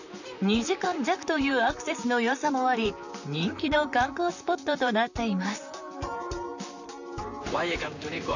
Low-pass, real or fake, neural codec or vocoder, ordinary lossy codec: 7.2 kHz; fake; vocoder, 44.1 kHz, 128 mel bands, Pupu-Vocoder; none